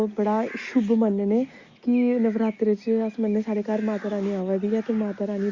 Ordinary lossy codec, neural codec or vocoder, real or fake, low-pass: Opus, 64 kbps; none; real; 7.2 kHz